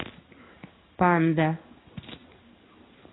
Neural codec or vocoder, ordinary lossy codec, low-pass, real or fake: codec, 16 kHz in and 24 kHz out, 1 kbps, XY-Tokenizer; AAC, 16 kbps; 7.2 kHz; fake